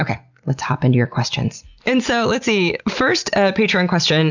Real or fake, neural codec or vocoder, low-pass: real; none; 7.2 kHz